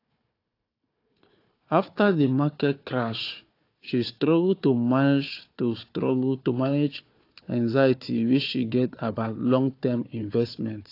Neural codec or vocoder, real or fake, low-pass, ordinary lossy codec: codec, 16 kHz, 4 kbps, FunCodec, trained on Chinese and English, 50 frames a second; fake; 5.4 kHz; AAC, 32 kbps